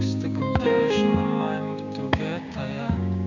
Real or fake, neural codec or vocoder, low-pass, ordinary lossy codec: fake; codec, 16 kHz, 6 kbps, DAC; 7.2 kHz; none